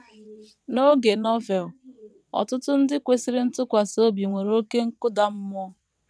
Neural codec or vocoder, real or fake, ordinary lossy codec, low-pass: vocoder, 22.05 kHz, 80 mel bands, WaveNeXt; fake; none; none